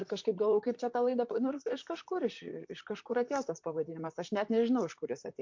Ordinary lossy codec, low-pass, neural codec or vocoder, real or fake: MP3, 48 kbps; 7.2 kHz; vocoder, 44.1 kHz, 128 mel bands, Pupu-Vocoder; fake